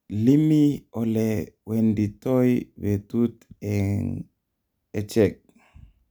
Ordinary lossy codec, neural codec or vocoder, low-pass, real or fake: none; none; none; real